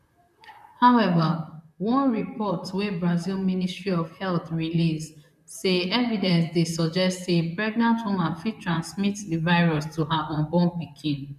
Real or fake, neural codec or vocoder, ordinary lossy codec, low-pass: fake; vocoder, 44.1 kHz, 128 mel bands, Pupu-Vocoder; MP3, 96 kbps; 14.4 kHz